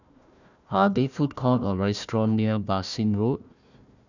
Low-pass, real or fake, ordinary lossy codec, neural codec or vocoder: 7.2 kHz; fake; none; codec, 16 kHz, 1 kbps, FunCodec, trained on Chinese and English, 50 frames a second